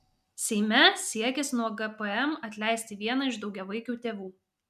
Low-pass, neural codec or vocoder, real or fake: 14.4 kHz; none; real